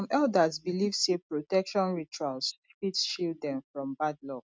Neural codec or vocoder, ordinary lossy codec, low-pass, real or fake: none; none; none; real